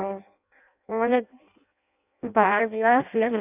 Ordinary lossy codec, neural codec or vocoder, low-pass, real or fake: none; codec, 16 kHz in and 24 kHz out, 0.6 kbps, FireRedTTS-2 codec; 3.6 kHz; fake